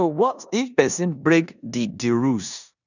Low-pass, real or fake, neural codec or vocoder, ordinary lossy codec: 7.2 kHz; fake; codec, 16 kHz in and 24 kHz out, 0.9 kbps, LongCat-Audio-Codec, four codebook decoder; none